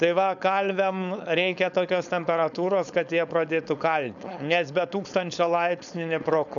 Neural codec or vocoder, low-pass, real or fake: codec, 16 kHz, 4.8 kbps, FACodec; 7.2 kHz; fake